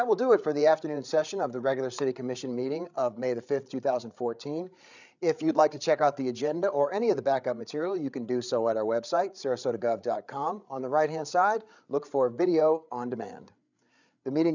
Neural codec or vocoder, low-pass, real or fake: codec, 16 kHz, 8 kbps, FreqCodec, larger model; 7.2 kHz; fake